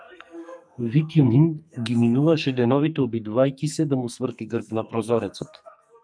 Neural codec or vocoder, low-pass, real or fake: codec, 44.1 kHz, 2.6 kbps, SNAC; 9.9 kHz; fake